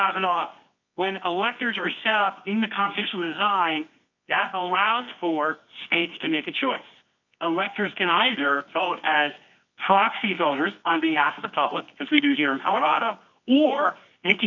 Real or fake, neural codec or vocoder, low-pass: fake; codec, 24 kHz, 0.9 kbps, WavTokenizer, medium music audio release; 7.2 kHz